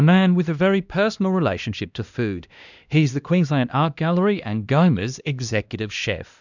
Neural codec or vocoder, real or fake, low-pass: codec, 16 kHz, 1 kbps, X-Codec, HuBERT features, trained on LibriSpeech; fake; 7.2 kHz